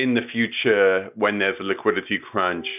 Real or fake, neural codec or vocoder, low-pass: real; none; 3.6 kHz